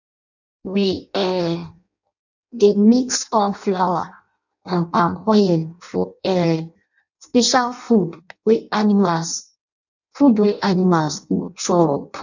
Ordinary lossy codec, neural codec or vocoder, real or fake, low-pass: none; codec, 16 kHz in and 24 kHz out, 0.6 kbps, FireRedTTS-2 codec; fake; 7.2 kHz